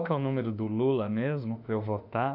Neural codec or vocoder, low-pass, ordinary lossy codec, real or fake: autoencoder, 48 kHz, 32 numbers a frame, DAC-VAE, trained on Japanese speech; 5.4 kHz; none; fake